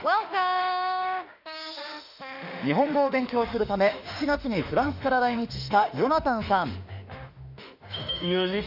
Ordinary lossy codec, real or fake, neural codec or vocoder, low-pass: none; fake; autoencoder, 48 kHz, 32 numbers a frame, DAC-VAE, trained on Japanese speech; 5.4 kHz